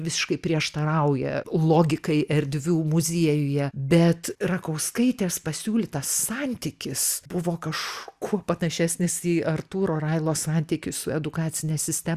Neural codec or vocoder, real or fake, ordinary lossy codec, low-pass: none; real; Opus, 64 kbps; 14.4 kHz